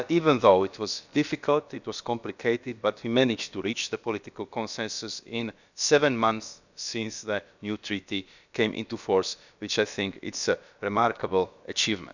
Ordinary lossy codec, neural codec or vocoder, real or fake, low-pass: none; codec, 16 kHz, about 1 kbps, DyCAST, with the encoder's durations; fake; 7.2 kHz